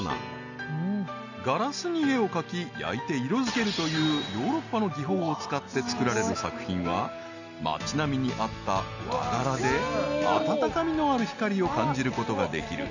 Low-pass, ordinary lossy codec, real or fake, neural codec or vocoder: 7.2 kHz; none; real; none